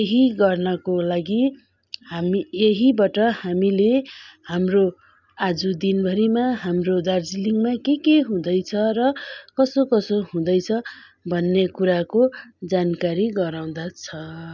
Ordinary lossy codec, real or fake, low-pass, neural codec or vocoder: none; real; 7.2 kHz; none